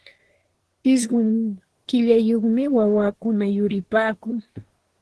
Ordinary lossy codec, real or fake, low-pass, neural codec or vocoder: Opus, 16 kbps; fake; 10.8 kHz; codec, 24 kHz, 1 kbps, SNAC